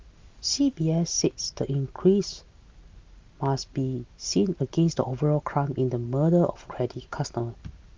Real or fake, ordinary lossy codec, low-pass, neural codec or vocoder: real; Opus, 32 kbps; 7.2 kHz; none